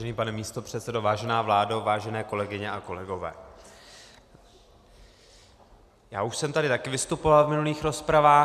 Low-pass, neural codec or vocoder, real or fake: 14.4 kHz; none; real